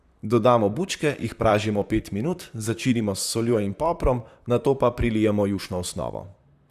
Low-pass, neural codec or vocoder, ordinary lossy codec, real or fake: 14.4 kHz; vocoder, 44.1 kHz, 128 mel bands, Pupu-Vocoder; Opus, 64 kbps; fake